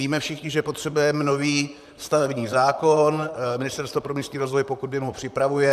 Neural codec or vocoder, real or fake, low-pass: vocoder, 44.1 kHz, 128 mel bands, Pupu-Vocoder; fake; 14.4 kHz